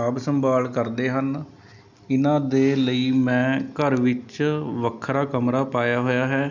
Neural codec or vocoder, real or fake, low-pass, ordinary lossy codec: none; real; 7.2 kHz; none